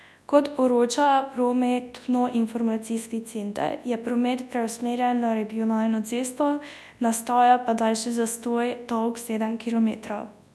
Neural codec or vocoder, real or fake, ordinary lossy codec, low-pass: codec, 24 kHz, 0.9 kbps, WavTokenizer, large speech release; fake; none; none